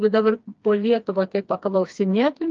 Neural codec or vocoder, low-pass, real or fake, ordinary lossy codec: codec, 16 kHz, 2 kbps, FreqCodec, smaller model; 7.2 kHz; fake; Opus, 24 kbps